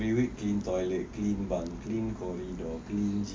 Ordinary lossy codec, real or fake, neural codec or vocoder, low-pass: none; real; none; none